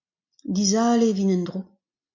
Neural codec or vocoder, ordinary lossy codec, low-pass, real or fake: none; MP3, 64 kbps; 7.2 kHz; real